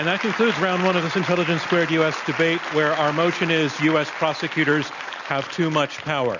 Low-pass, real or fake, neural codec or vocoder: 7.2 kHz; real; none